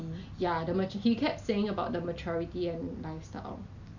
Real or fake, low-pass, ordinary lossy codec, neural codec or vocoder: real; 7.2 kHz; none; none